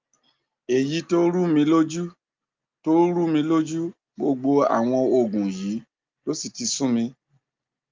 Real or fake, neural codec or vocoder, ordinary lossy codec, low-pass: real; none; Opus, 24 kbps; 7.2 kHz